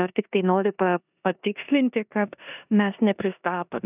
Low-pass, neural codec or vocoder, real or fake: 3.6 kHz; codec, 16 kHz in and 24 kHz out, 0.9 kbps, LongCat-Audio-Codec, four codebook decoder; fake